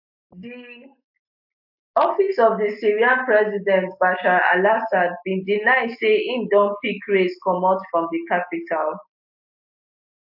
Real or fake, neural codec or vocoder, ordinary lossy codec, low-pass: real; none; AAC, 48 kbps; 5.4 kHz